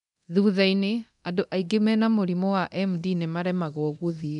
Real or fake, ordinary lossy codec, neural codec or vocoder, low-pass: fake; none; codec, 24 kHz, 0.9 kbps, DualCodec; 10.8 kHz